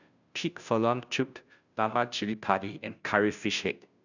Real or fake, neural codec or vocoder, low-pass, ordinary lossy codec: fake; codec, 16 kHz, 0.5 kbps, FunCodec, trained on Chinese and English, 25 frames a second; 7.2 kHz; none